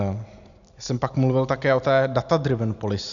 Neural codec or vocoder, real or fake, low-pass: none; real; 7.2 kHz